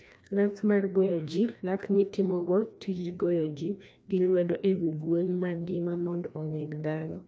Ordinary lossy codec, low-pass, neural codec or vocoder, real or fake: none; none; codec, 16 kHz, 1 kbps, FreqCodec, larger model; fake